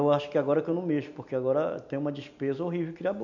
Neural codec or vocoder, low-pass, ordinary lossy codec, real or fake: none; 7.2 kHz; none; real